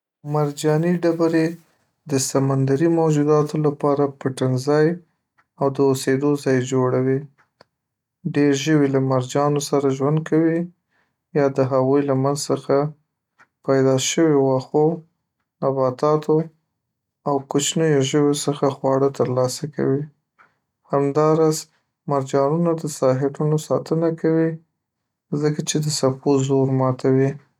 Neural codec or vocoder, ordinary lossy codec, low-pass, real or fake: none; none; 19.8 kHz; real